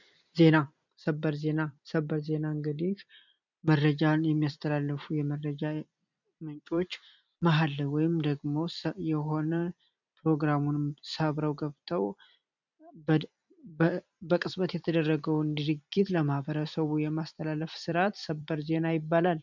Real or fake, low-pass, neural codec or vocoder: fake; 7.2 kHz; vocoder, 44.1 kHz, 128 mel bands every 256 samples, BigVGAN v2